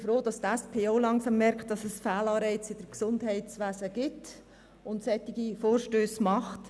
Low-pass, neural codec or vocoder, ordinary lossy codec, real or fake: none; none; none; real